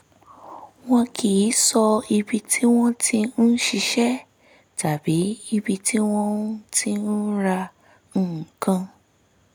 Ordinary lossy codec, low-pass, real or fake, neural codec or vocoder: none; none; real; none